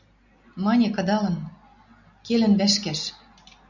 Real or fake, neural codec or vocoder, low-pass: real; none; 7.2 kHz